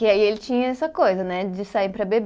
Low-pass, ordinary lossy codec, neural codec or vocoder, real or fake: none; none; none; real